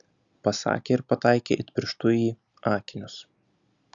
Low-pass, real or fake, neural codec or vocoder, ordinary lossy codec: 7.2 kHz; real; none; Opus, 64 kbps